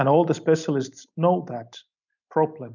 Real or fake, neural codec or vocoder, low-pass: real; none; 7.2 kHz